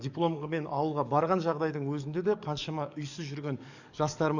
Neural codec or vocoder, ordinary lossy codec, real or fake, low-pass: codec, 44.1 kHz, 7.8 kbps, DAC; none; fake; 7.2 kHz